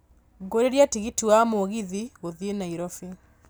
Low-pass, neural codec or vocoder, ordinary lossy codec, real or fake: none; none; none; real